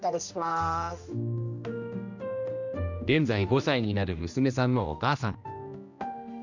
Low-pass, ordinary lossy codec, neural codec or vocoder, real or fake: 7.2 kHz; none; codec, 16 kHz, 1 kbps, X-Codec, HuBERT features, trained on general audio; fake